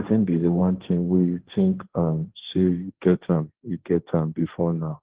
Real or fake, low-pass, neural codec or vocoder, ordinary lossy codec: fake; 3.6 kHz; codec, 16 kHz, 1.1 kbps, Voila-Tokenizer; Opus, 16 kbps